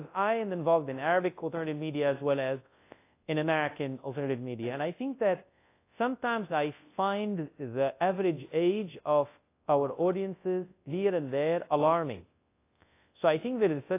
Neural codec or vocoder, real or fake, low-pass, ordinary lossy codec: codec, 24 kHz, 0.9 kbps, WavTokenizer, large speech release; fake; 3.6 kHz; AAC, 24 kbps